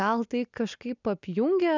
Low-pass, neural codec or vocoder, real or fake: 7.2 kHz; none; real